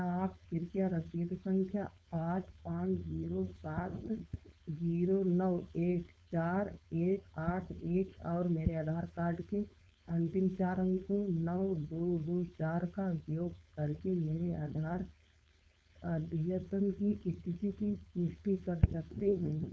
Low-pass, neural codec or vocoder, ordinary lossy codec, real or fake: none; codec, 16 kHz, 4.8 kbps, FACodec; none; fake